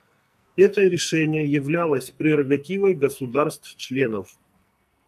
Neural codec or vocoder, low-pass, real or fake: codec, 44.1 kHz, 2.6 kbps, SNAC; 14.4 kHz; fake